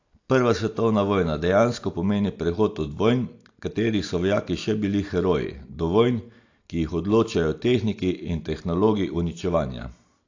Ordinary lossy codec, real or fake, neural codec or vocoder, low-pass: AAC, 48 kbps; real; none; 7.2 kHz